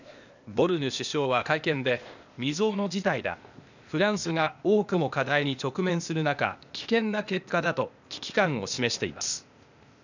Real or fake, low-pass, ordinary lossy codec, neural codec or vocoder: fake; 7.2 kHz; none; codec, 16 kHz, 0.8 kbps, ZipCodec